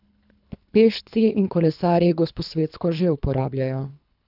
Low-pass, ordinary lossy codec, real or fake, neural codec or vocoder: 5.4 kHz; none; fake; codec, 24 kHz, 3 kbps, HILCodec